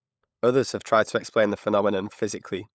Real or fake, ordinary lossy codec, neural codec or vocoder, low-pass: fake; none; codec, 16 kHz, 16 kbps, FunCodec, trained on LibriTTS, 50 frames a second; none